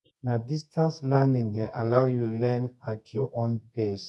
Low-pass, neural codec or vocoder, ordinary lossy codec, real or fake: none; codec, 24 kHz, 0.9 kbps, WavTokenizer, medium music audio release; none; fake